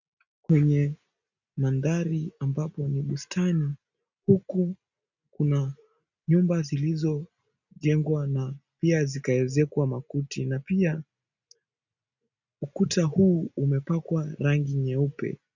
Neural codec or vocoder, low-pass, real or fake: none; 7.2 kHz; real